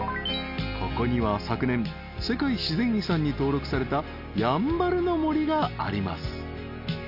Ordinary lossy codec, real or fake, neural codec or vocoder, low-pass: none; real; none; 5.4 kHz